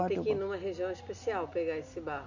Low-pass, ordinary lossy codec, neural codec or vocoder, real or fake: 7.2 kHz; none; none; real